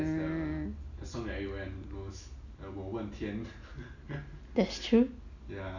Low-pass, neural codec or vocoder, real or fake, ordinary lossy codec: 7.2 kHz; none; real; none